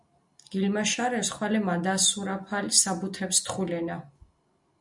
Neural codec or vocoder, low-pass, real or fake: none; 10.8 kHz; real